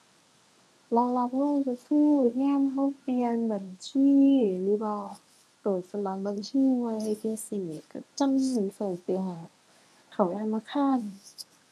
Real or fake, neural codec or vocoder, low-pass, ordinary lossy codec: fake; codec, 24 kHz, 0.9 kbps, WavTokenizer, medium speech release version 1; none; none